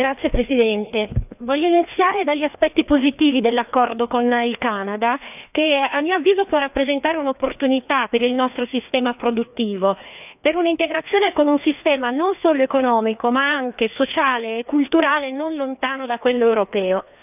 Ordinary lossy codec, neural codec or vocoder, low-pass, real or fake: none; codec, 16 kHz, 2 kbps, FreqCodec, larger model; 3.6 kHz; fake